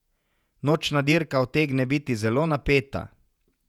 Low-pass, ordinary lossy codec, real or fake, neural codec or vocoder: 19.8 kHz; none; fake; vocoder, 48 kHz, 128 mel bands, Vocos